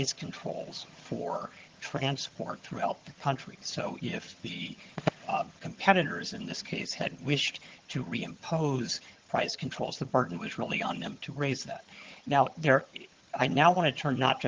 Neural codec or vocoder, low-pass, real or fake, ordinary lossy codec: vocoder, 22.05 kHz, 80 mel bands, HiFi-GAN; 7.2 kHz; fake; Opus, 16 kbps